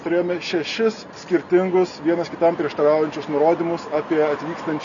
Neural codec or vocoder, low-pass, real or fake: none; 7.2 kHz; real